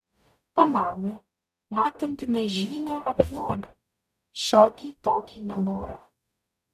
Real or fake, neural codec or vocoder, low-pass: fake; codec, 44.1 kHz, 0.9 kbps, DAC; 14.4 kHz